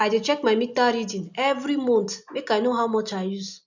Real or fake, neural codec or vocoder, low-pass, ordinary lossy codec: real; none; 7.2 kHz; none